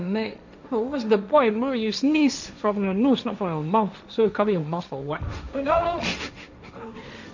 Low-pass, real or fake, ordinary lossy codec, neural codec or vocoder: 7.2 kHz; fake; none; codec, 16 kHz, 1.1 kbps, Voila-Tokenizer